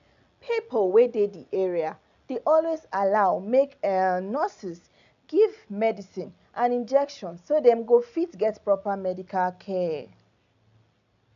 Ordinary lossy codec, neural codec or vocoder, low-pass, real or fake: none; none; 7.2 kHz; real